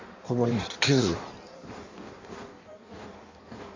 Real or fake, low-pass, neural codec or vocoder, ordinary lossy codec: fake; 7.2 kHz; codec, 16 kHz in and 24 kHz out, 1.1 kbps, FireRedTTS-2 codec; MP3, 48 kbps